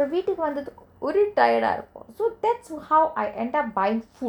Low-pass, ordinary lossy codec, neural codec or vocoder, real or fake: 19.8 kHz; none; none; real